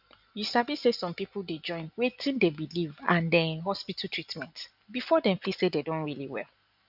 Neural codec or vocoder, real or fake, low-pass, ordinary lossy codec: none; real; 5.4 kHz; none